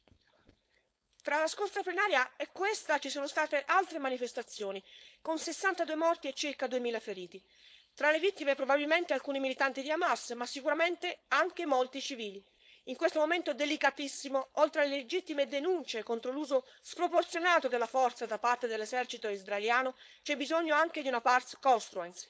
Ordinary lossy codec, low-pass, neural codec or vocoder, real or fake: none; none; codec, 16 kHz, 4.8 kbps, FACodec; fake